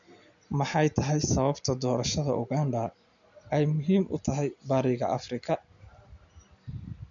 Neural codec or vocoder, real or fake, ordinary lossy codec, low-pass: none; real; none; 7.2 kHz